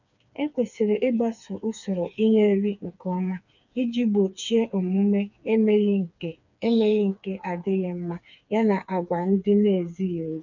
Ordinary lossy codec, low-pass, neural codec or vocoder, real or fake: none; 7.2 kHz; codec, 16 kHz, 4 kbps, FreqCodec, smaller model; fake